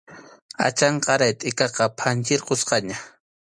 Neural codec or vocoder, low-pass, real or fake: none; 9.9 kHz; real